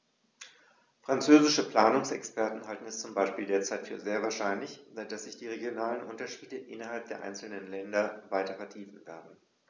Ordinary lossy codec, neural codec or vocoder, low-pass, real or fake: none; none; none; real